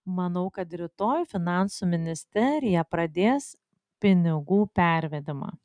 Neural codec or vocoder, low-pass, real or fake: none; 9.9 kHz; real